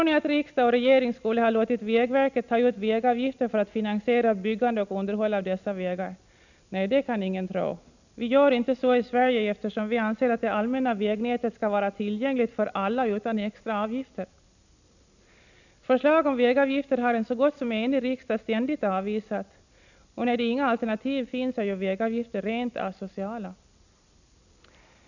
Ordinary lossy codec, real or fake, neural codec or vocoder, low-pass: none; real; none; 7.2 kHz